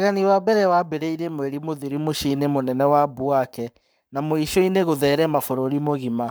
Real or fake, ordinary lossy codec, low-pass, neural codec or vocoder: fake; none; none; codec, 44.1 kHz, 7.8 kbps, Pupu-Codec